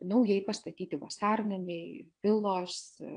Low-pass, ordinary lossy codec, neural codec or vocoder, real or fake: 9.9 kHz; AAC, 48 kbps; vocoder, 22.05 kHz, 80 mel bands, WaveNeXt; fake